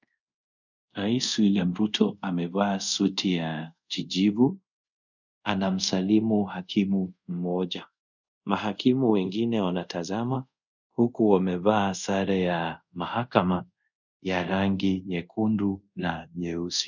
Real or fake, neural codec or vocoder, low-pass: fake; codec, 24 kHz, 0.5 kbps, DualCodec; 7.2 kHz